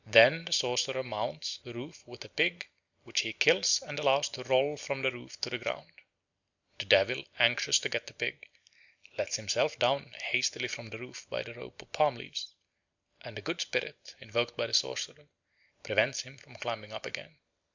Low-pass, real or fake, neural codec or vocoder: 7.2 kHz; real; none